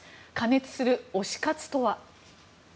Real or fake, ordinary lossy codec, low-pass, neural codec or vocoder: real; none; none; none